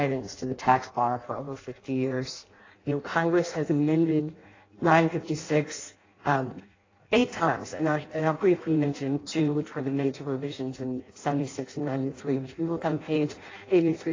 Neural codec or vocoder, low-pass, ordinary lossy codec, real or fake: codec, 16 kHz in and 24 kHz out, 0.6 kbps, FireRedTTS-2 codec; 7.2 kHz; AAC, 32 kbps; fake